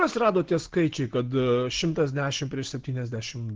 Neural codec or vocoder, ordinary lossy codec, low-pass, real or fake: none; Opus, 16 kbps; 7.2 kHz; real